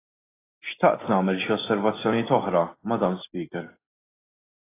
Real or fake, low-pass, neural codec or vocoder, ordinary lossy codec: real; 3.6 kHz; none; AAC, 16 kbps